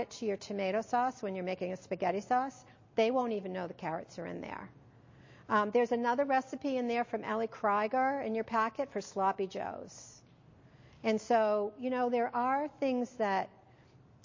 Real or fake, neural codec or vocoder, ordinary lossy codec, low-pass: real; none; MP3, 32 kbps; 7.2 kHz